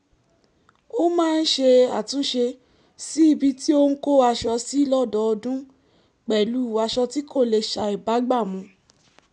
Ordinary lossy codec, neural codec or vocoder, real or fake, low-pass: none; none; real; 10.8 kHz